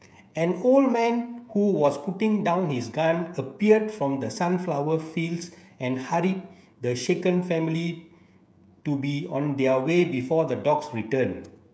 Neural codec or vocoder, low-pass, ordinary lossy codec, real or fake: codec, 16 kHz, 16 kbps, FreqCodec, smaller model; none; none; fake